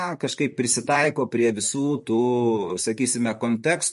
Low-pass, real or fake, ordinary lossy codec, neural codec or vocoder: 14.4 kHz; fake; MP3, 48 kbps; vocoder, 44.1 kHz, 128 mel bands, Pupu-Vocoder